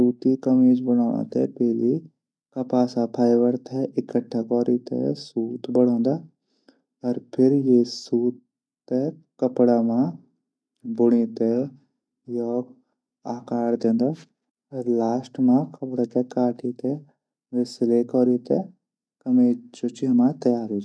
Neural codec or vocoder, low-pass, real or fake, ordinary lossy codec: none; none; real; none